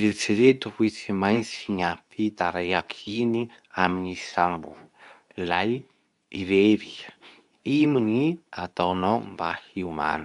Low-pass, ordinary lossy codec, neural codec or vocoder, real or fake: 10.8 kHz; none; codec, 24 kHz, 0.9 kbps, WavTokenizer, medium speech release version 2; fake